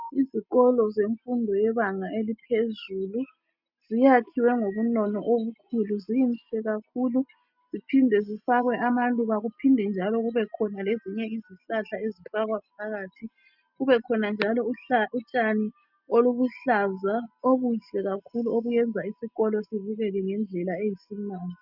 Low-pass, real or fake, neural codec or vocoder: 5.4 kHz; real; none